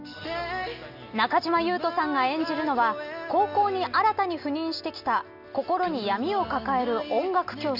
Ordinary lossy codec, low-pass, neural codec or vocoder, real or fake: none; 5.4 kHz; none; real